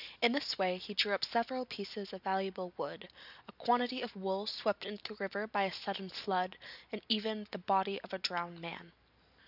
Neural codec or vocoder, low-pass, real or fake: none; 5.4 kHz; real